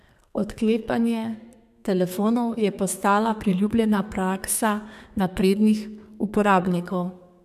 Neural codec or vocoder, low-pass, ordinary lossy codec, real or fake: codec, 32 kHz, 1.9 kbps, SNAC; 14.4 kHz; none; fake